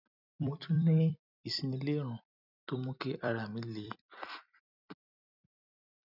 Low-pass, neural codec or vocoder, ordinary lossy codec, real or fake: 5.4 kHz; vocoder, 44.1 kHz, 128 mel bands every 256 samples, BigVGAN v2; none; fake